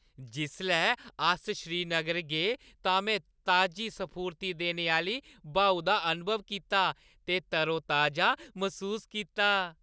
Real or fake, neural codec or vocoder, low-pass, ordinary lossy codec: real; none; none; none